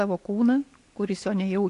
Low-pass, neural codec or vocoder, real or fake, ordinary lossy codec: 10.8 kHz; none; real; AAC, 48 kbps